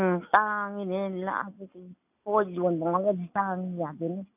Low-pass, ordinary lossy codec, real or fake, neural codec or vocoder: 3.6 kHz; none; real; none